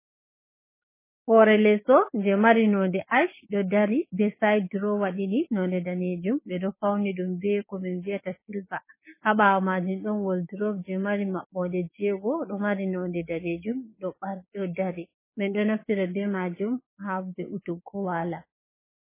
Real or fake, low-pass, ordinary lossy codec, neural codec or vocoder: fake; 3.6 kHz; MP3, 16 kbps; codec, 16 kHz, 6 kbps, DAC